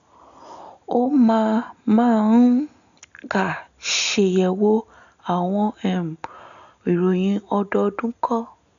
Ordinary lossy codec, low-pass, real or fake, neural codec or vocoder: none; 7.2 kHz; real; none